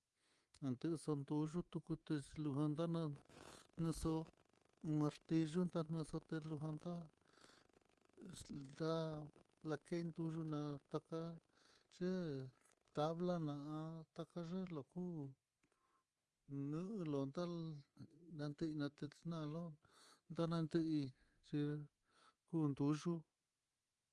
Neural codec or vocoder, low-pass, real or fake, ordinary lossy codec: codec, 24 kHz, 3.1 kbps, DualCodec; 10.8 kHz; fake; Opus, 32 kbps